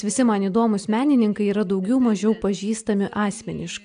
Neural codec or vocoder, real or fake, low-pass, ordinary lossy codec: none; real; 9.9 kHz; AAC, 96 kbps